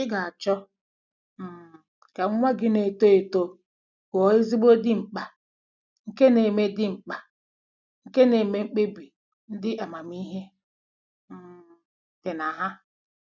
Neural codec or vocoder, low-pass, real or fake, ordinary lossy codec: none; 7.2 kHz; real; none